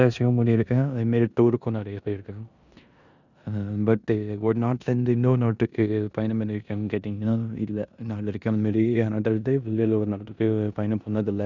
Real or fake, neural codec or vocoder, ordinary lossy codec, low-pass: fake; codec, 16 kHz in and 24 kHz out, 0.9 kbps, LongCat-Audio-Codec, four codebook decoder; none; 7.2 kHz